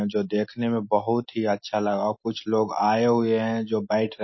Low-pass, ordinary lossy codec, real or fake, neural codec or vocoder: 7.2 kHz; MP3, 24 kbps; real; none